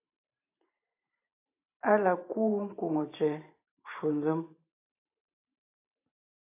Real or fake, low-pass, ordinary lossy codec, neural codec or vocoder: fake; 3.6 kHz; AAC, 24 kbps; vocoder, 44.1 kHz, 128 mel bands every 512 samples, BigVGAN v2